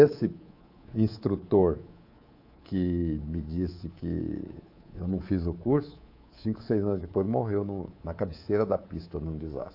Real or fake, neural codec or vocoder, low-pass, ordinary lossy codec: fake; codec, 24 kHz, 3.1 kbps, DualCodec; 5.4 kHz; AAC, 32 kbps